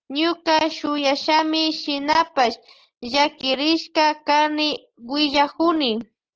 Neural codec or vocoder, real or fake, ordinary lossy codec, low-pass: none; real; Opus, 16 kbps; 7.2 kHz